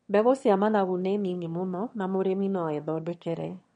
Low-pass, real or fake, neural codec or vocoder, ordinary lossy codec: 9.9 kHz; fake; autoencoder, 22.05 kHz, a latent of 192 numbers a frame, VITS, trained on one speaker; MP3, 48 kbps